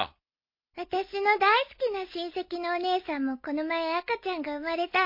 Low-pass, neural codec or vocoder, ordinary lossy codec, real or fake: 5.4 kHz; none; MP3, 32 kbps; real